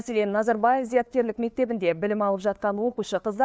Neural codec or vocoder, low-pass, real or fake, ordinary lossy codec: codec, 16 kHz, 2 kbps, FunCodec, trained on LibriTTS, 25 frames a second; none; fake; none